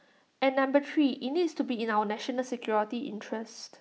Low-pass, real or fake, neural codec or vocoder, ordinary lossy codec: none; real; none; none